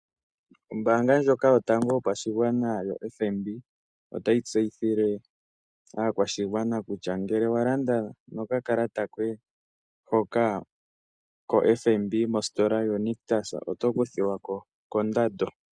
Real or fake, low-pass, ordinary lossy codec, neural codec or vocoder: real; 9.9 kHz; Opus, 64 kbps; none